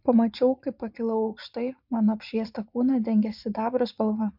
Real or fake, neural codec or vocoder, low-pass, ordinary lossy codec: fake; vocoder, 44.1 kHz, 80 mel bands, Vocos; 5.4 kHz; MP3, 48 kbps